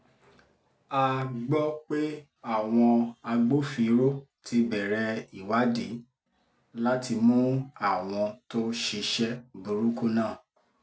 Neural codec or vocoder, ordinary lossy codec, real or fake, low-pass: none; none; real; none